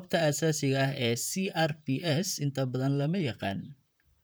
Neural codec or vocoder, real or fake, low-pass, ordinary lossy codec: vocoder, 44.1 kHz, 128 mel bands, Pupu-Vocoder; fake; none; none